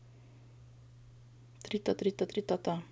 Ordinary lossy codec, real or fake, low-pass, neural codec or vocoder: none; real; none; none